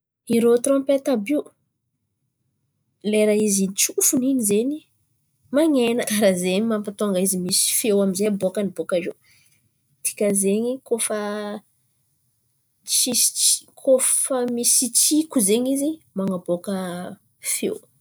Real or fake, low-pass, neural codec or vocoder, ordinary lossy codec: real; none; none; none